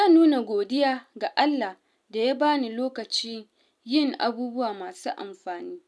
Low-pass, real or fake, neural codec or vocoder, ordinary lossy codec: none; real; none; none